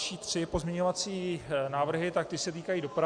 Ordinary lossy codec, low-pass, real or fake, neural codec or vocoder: Opus, 64 kbps; 9.9 kHz; real; none